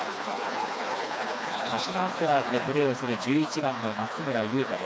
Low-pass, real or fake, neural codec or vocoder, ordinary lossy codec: none; fake; codec, 16 kHz, 2 kbps, FreqCodec, smaller model; none